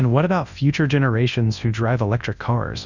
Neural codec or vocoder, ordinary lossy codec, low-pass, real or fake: codec, 24 kHz, 0.9 kbps, WavTokenizer, large speech release; Opus, 64 kbps; 7.2 kHz; fake